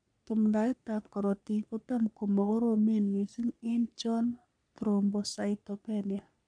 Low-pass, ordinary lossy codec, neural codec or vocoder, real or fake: 9.9 kHz; none; codec, 44.1 kHz, 3.4 kbps, Pupu-Codec; fake